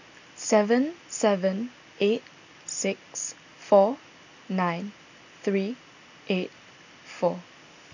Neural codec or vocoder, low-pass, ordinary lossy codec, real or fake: none; 7.2 kHz; none; real